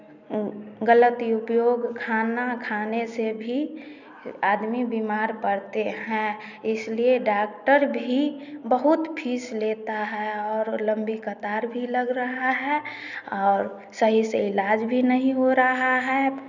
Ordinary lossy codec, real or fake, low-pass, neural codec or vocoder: none; real; 7.2 kHz; none